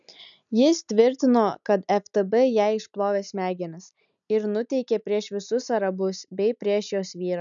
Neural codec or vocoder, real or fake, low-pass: none; real; 7.2 kHz